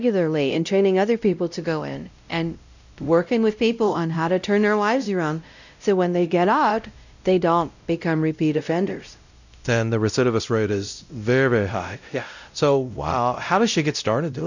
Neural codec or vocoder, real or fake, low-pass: codec, 16 kHz, 0.5 kbps, X-Codec, WavLM features, trained on Multilingual LibriSpeech; fake; 7.2 kHz